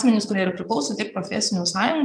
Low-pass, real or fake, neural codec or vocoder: 9.9 kHz; fake; vocoder, 22.05 kHz, 80 mel bands, Vocos